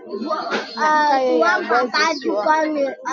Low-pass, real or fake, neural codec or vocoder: 7.2 kHz; real; none